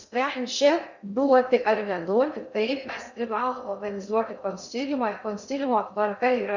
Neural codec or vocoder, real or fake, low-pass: codec, 16 kHz in and 24 kHz out, 0.6 kbps, FocalCodec, streaming, 2048 codes; fake; 7.2 kHz